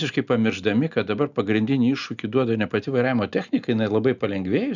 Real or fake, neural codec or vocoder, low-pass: real; none; 7.2 kHz